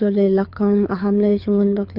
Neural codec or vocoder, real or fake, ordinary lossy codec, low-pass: codec, 24 kHz, 6 kbps, HILCodec; fake; none; 5.4 kHz